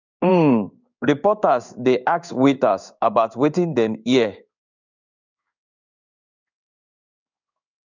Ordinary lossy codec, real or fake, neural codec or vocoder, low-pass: none; fake; codec, 16 kHz in and 24 kHz out, 1 kbps, XY-Tokenizer; 7.2 kHz